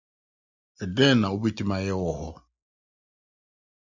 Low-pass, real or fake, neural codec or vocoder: 7.2 kHz; real; none